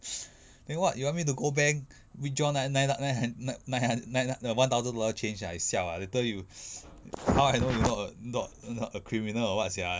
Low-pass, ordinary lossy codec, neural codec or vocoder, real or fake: none; none; none; real